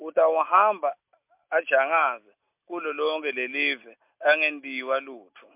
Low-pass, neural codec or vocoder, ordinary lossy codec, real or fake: 3.6 kHz; none; MP3, 32 kbps; real